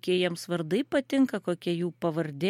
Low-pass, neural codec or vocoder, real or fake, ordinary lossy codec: 19.8 kHz; none; real; MP3, 64 kbps